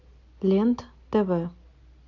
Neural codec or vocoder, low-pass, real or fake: none; 7.2 kHz; real